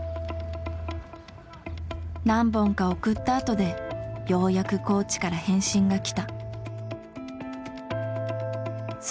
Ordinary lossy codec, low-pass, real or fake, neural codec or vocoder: none; none; real; none